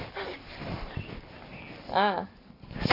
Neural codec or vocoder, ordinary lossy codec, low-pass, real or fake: none; none; 5.4 kHz; real